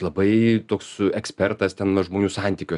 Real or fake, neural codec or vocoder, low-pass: real; none; 10.8 kHz